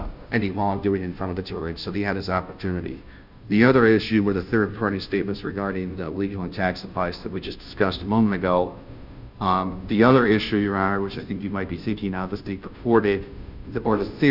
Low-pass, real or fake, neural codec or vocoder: 5.4 kHz; fake; codec, 16 kHz, 0.5 kbps, FunCodec, trained on Chinese and English, 25 frames a second